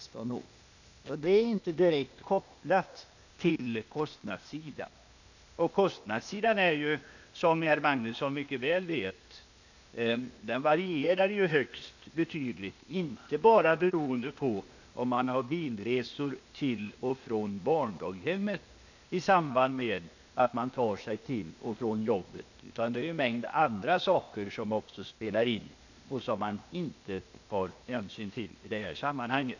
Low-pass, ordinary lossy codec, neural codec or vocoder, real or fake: 7.2 kHz; none; codec, 16 kHz, 0.8 kbps, ZipCodec; fake